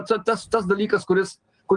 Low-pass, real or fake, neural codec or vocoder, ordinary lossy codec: 9.9 kHz; real; none; Opus, 24 kbps